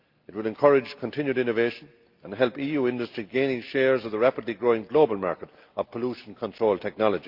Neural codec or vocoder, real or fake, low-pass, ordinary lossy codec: none; real; 5.4 kHz; Opus, 24 kbps